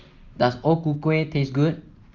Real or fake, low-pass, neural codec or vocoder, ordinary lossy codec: real; 7.2 kHz; none; Opus, 32 kbps